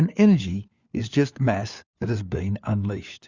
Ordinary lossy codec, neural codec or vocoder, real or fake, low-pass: Opus, 64 kbps; codec, 16 kHz, 4 kbps, FunCodec, trained on LibriTTS, 50 frames a second; fake; 7.2 kHz